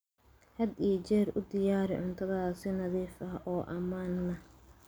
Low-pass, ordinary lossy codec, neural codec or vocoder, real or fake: none; none; none; real